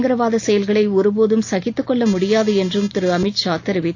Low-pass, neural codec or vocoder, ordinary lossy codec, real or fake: 7.2 kHz; none; AAC, 48 kbps; real